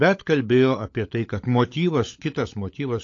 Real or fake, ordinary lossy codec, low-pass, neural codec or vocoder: fake; AAC, 48 kbps; 7.2 kHz; codec, 16 kHz, 16 kbps, FunCodec, trained on LibriTTS, 50 frames a second